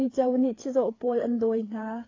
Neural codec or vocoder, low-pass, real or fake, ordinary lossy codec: codec, 16 kHz, 4 kbps, FreqCodec, larger model; 7.2 kHz; fake; AAC, 32 kbps